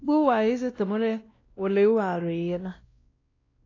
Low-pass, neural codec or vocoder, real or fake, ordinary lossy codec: 7.2 kHz; codec, 16 kHz, 1 kbps, X-Codec, WavLM features, trained on Multilingual LibriSpeech; fake; AAC, 32 kbps